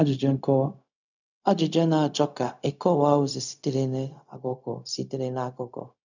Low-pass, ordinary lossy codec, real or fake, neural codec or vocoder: 7.2 kHz; none; fake; codec, 16 kHz, 0.4 kbps, LongCat-Audio-Codec